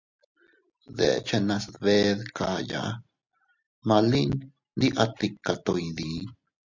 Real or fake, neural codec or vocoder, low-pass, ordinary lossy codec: real; none; 7.2 kHz; MP3, 64 kbps